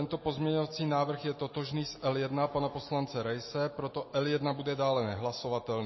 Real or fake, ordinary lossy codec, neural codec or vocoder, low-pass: real; MP3, 24 kbps; none; 7.2 kHz